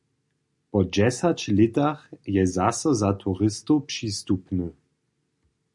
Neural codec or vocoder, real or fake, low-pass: none; real; 10.8 kHz